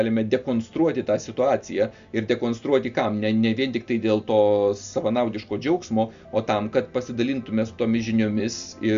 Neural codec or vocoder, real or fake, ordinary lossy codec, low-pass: none; real; Opus, 64 kbps; 7.2 kHz